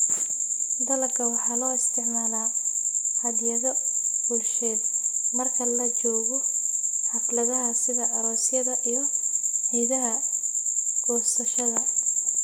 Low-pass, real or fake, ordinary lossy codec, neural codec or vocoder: none; real; none; none